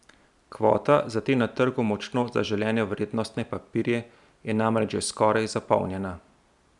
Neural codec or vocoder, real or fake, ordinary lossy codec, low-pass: none; real; none; 10.8 kHz